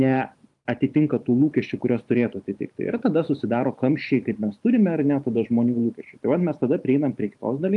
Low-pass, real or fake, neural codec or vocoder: 10.8 kHz; fake; vocoder, 44.1 kHz, 128 mel bands every 512 samples, BigVGAN v2